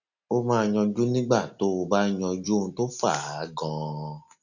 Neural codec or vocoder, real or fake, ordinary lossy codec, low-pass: none; real; none; 7.2 kHz